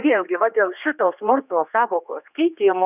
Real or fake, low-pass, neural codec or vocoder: fake; 3.6 kHz; codec, 16 kHz, 2 kbps, X-Codec, HuBERT features, trained on general audio